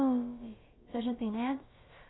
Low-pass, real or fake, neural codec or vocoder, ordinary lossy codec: 7.2 kHz; fake; codec, 16 kHz, about 1 kbps, DyCAST, with the encoder's durations; AAC, 16 kbps